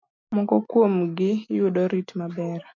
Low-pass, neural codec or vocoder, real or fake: 7.2 kHz; none; real